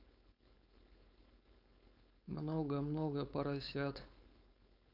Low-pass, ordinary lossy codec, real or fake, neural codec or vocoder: 5.4 kHz; none; fake; codec, 16 kHz, 4.8 kbps, FACodec